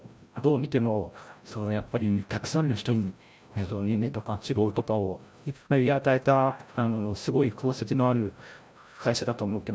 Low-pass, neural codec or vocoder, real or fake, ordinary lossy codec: none; codec, 16 kHz, 0.5 kbps, FreqCodec, larger model; fake; none